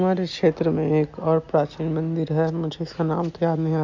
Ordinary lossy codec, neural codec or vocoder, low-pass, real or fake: MP3, 48 kbps; none; 7.2 kHz; real